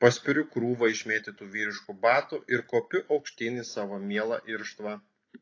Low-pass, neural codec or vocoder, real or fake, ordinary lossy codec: 7.2 kHz; none; real; AAC, 32 kbps